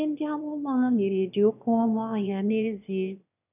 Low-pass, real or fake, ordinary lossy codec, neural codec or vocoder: 3.6 kHz; fake; none; autoencoder, 22.05 kHz, a latent of 192 numbers a frame, VITS, trained on one speaker